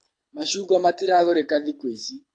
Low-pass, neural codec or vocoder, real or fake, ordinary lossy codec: 9.9 kHz; codec, 24 kHz, 6 kbps, HILCodec; fake; AAC, 48 kbps